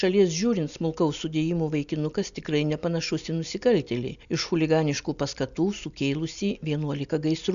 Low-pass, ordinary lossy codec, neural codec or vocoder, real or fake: 7.2 kHz; MP3, 96 kbps; none; real